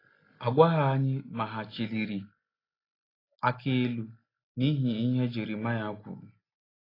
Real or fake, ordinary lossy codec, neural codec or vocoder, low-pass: real; AAC, 24 kbps; none; 5.4 kHz